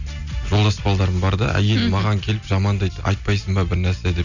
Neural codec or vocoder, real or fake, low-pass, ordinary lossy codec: none; real; 7.2 kHz; none